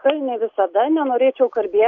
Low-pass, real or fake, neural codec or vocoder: 7.2 kHz; real; none